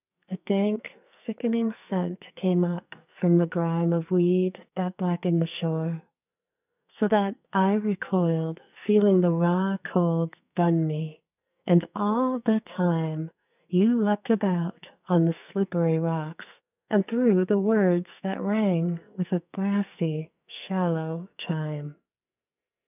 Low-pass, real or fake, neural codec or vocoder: 3.6 kHz; fake; codec, 44.1 kHz, 2.6 kbps, SNAC